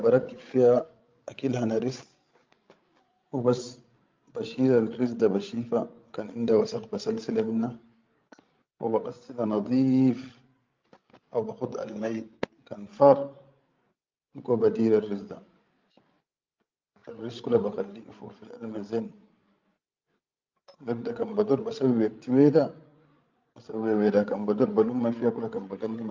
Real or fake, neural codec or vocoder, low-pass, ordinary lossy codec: fake; codec, 16 kHz, 16 kbps, FreqCodec, larger model; 7.2 kHz; Opus, 24 kbps